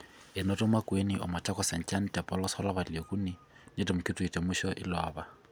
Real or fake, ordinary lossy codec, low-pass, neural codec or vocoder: real; none; none; none